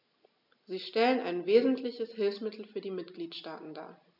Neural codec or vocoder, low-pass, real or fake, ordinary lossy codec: none; 5.4 kHz; real; none